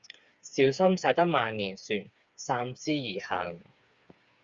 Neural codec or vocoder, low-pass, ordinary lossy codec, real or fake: codec, 16 kHz, 4 kbps, FreqCodec, smaller model; 7.2 kHz; Opus, 64 kbps; fake